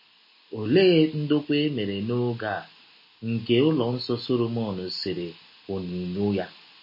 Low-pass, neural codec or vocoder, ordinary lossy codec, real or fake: 5.4 kHz; none; MP3, 24 kbps; real